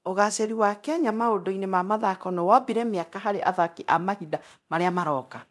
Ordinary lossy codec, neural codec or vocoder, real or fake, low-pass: none; codec, 24 kHz, 0.9 kbps, DualCodec; fake; none